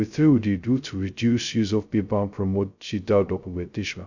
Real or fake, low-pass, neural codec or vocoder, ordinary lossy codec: fake; 7.2 kHz; codec, 16 kHz, 0.2 kbps, FocalCodec; none